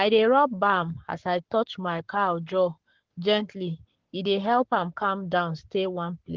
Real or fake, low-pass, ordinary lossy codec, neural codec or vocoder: fake; 7.2 kHz; Opus, 16 kbps; codec, 44.1 kHz, 7.8 kbps, Pupu-Codec